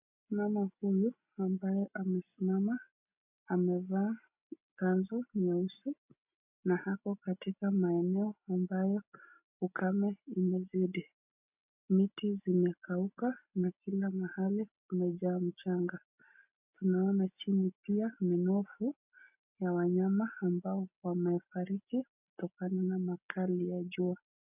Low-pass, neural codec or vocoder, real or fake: 3.6 kHz; none; real